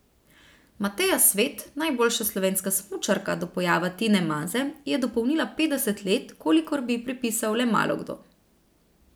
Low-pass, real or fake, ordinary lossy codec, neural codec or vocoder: none; real; none; none